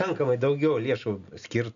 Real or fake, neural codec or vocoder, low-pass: real; none; 7.2 kHz